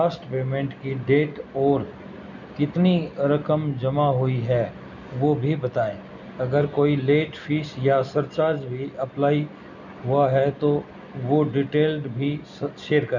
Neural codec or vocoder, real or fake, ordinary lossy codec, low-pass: none; real; none; 7.2 kHz